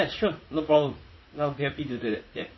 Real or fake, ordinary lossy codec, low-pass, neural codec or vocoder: fake; MP3, 24 kbps; 7.2 kHz; vocoder, 44.1 kHz, 80 mel bands, Vocos